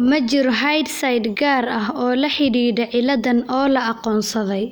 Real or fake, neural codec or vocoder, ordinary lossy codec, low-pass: real; none; none; none